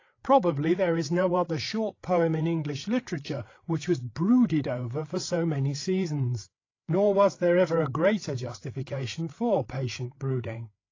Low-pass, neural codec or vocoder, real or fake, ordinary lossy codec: 7.2 kHz; codec, 16 kHz, 8 kbps, FreqCodec, larger model; fake; AAC, 32 kbps